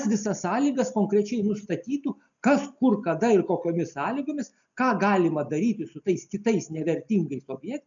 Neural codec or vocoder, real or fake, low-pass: none; real; 7.2 kHz